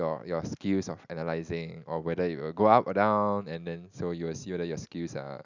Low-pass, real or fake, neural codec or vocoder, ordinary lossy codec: 7.2 kHz; real; none; none